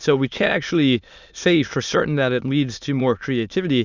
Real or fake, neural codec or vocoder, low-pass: fake; autoencoder, 22.05 kHz, a latent of 192 numbers a frame, VITS, trained on many speakers; 7.2 kHz